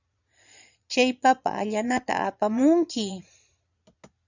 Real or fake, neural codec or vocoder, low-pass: fake; vocoder, 22.05 kHz, 80 mel bands, Vocos; 7.2 kHz